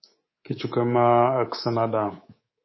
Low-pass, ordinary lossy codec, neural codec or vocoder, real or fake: 7.2 kHz; MP3, 24 kbps; codec, 24 kHz, 3.1 kbps, DualCodec; fake